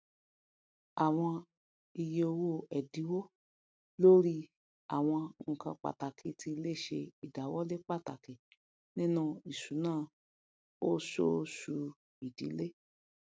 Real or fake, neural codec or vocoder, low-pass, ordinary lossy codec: real; none; none; none